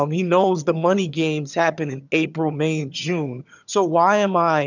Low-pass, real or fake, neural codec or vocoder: 7.2 kHz; fake; vocoder, 22.05 kHz, 80 mel bands, HiFi-GAN